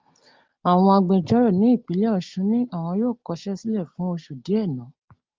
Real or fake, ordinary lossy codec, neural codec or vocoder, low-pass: real; Opus, 24 kbps; none; 7.2 kHz